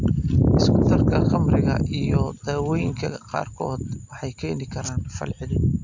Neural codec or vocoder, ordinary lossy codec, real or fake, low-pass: none; MP3, 64 kbps; real; 7.2 kHz